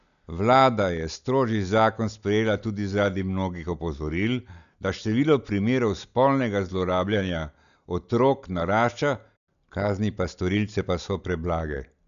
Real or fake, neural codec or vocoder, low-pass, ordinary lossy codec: real; none; 7.2 kHz; none